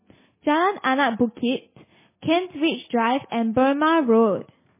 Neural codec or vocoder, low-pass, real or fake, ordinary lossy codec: none; 3.6 kHz; real; MP3, 16 kbps